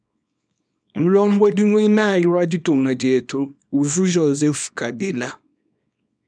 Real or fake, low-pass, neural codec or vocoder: fake; 9.9 kHz; codec, 24 kHz, 0.9 kbps, WavTokenizer, small release